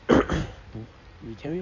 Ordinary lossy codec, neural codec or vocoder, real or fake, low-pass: none; codec, 16 kHz in and 24 kHz out, 2.2 kbps, FireRedTTS-2 codec; fake; 7.2 kHz